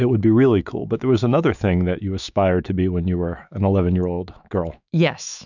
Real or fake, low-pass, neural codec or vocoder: fake; 7.2 kHz; autoencoder, 48 kHz, 128 numbers a frame, DAC-VAE, trained on Japanese speech